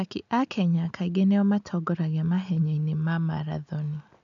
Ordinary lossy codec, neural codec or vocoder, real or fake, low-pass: none; none; real; 7.2 kHz